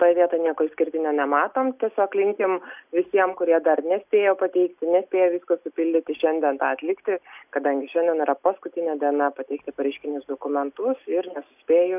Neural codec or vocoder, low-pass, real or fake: none; 3.6 kHz; real